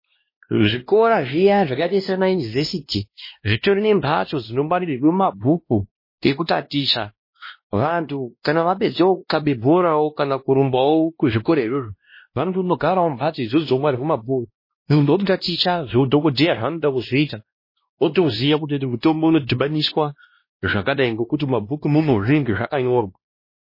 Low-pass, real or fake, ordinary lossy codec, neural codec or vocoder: 5.4 kHz; fake; MP3, 24 kbps; codec, 16 kHz, 1 kbps, X-Codec, WavLM features, trained on Multilingual LibriSpeech